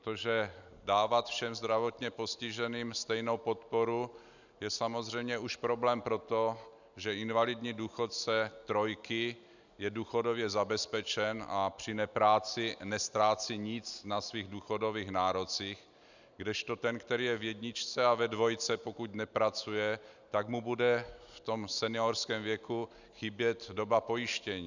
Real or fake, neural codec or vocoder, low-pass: real; none; 7.2 kHz